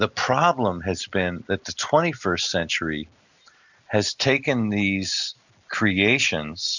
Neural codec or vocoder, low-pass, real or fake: none; 7.2 kHz; real